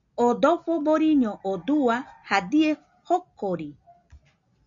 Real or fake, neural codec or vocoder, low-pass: real; none; 7.2 kHz